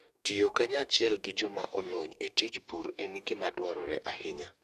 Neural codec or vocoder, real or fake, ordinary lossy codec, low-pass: codec, 44.1 kHz, 2.6 kbps, DAC; fake; none; 14.4 kHz